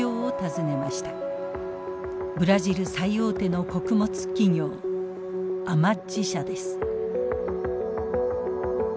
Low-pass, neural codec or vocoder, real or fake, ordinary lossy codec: none; none; real; none